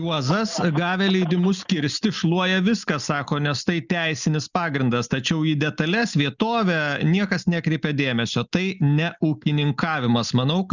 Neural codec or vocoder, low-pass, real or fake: none; 7.2 kHz; real